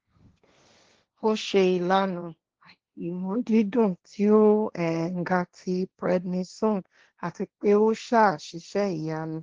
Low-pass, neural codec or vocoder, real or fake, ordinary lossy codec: 7.2 kHz; codec, 16 kHz, 1.1 kbps, Voila-Tokenizer; fake; Opus, 16 kbps